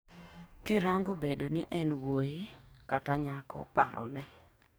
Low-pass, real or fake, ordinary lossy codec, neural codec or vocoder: none; fake; none; codec, 44.1 kHz, 2.6 kbps, DAC